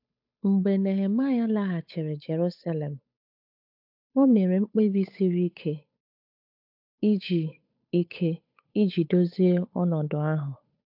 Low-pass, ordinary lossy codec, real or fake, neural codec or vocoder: 5.4 kHz; AAC, 48 kbps; fake; codec, 16 kHz, 8 kbps, FunCodec, trained on Chinese and English, 25 frames a second